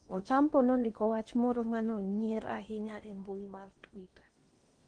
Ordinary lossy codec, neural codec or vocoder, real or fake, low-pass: Opus, 24 kbps; codec, 16 kHz in and 24 kHz out, 0.8 kbps, FocalCodec, streaming, 65536 codes; fake; 9.9 kHz